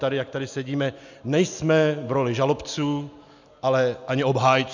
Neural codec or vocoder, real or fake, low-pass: none; real; 7.2 kHz